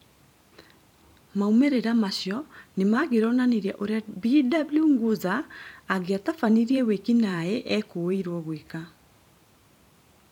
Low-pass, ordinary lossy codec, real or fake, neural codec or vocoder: 19.8 kHz; none; fake; vocoder, 44.1 kHz, 128 mel bands every 512 samples, BigVGAN v2